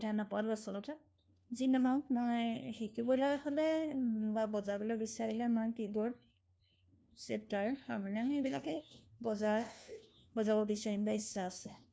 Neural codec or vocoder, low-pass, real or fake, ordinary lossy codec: codec, 16 kHz, 1 kbps, FunCodec, trained on LibriTTS, 50 frames a second; none; fake; none